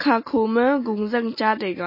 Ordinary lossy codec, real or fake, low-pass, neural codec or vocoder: MP3, 24 kbps; real; 5.4 kHz; none